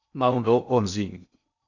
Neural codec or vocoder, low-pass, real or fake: codec, 16 kHz in and 24 kHz out, 0.6 kbps, FocalCodec, streaming, 2048 codes; 7.2 kHz; fake